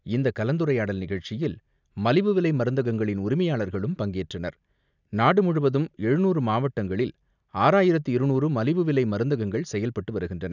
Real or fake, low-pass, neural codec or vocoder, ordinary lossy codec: real; 7.2 kHz; none; none